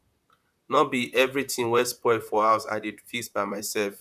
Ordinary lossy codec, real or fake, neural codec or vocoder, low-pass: none; fake; vocoder, 44.1 kHz, 128 mel bands, Pupu-Vocoder; 14.4 kHz